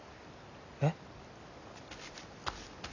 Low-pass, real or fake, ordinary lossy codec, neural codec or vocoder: 7.2 kHz; real; none; none